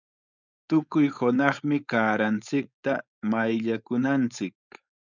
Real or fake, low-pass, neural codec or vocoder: fake; 7.2 kHz; codec, 16 kHz, 4.8 kbps, FACodec